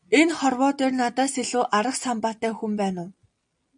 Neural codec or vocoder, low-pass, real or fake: none; 9.9 kHz; real